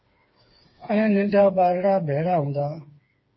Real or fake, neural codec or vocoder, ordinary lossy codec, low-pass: fake; codec, 16 kHz, 4 kbps, FreqCodec, smaller model; MP3, 24 kbps; 7.2 kHz